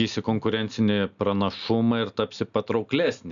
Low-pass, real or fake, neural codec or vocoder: 7.2 kHz; real; none